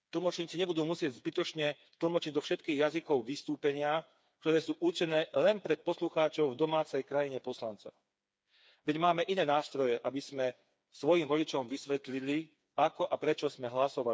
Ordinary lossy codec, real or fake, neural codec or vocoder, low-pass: none; fake; codec, 16 kHz, 4 kbps, FreqCodec, smaller model; none